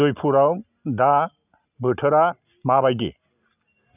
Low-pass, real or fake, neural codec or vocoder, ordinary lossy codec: 3.6 kHz; real; none; none